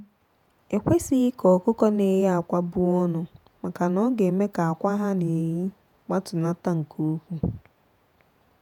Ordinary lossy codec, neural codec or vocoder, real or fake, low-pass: none; vocoder, 48 kHz, 128 mel bands, Vocos; fake; 19.8 kHz